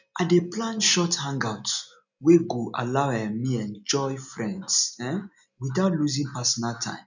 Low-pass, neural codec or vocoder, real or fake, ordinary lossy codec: 7.2 kHz; none; real; none